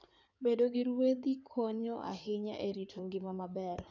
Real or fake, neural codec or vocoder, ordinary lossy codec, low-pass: fake; codec, 16 kHz in and 24 kHz out, 2.2 kbps, FireRedTTS-2 codec; none; 7.2 kHz